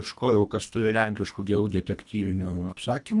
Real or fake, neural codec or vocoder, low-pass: fake; codec, 24 kHz, 1.5 kbps, HILCodec; 10.8 kHz